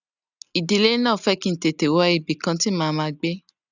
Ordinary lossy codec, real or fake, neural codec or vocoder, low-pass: none; real; none; 7.2 kHz